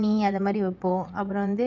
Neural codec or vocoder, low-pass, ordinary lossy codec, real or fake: vocoder, 22.05 kHz, 80 mel bands, WaveNeXt; 7.2 kHz; none; fake